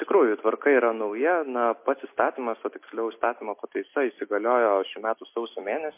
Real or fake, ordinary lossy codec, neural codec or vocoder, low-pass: real; MP3, 24 kbps; none; 3.6 kHz